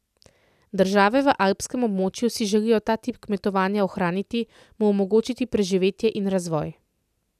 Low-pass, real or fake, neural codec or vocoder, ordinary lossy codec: 14.4 kHz; real; none; none